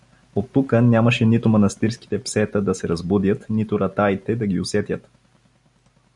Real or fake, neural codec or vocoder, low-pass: real; none; 10.8 kHz